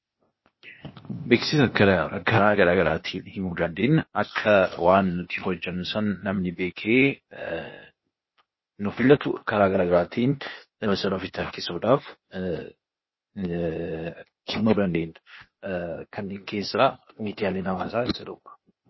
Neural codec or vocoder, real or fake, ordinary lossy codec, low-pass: codec, 16 kHz, 0.8 kbps, ZipCodec; fake; MP3, 24 kbps; 7.2 kHz